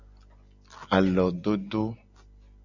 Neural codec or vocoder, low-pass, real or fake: none; 7.2 kHz; real